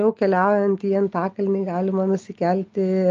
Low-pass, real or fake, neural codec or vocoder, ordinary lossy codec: 7.2 kHz; real; none; Opus, 24 kbps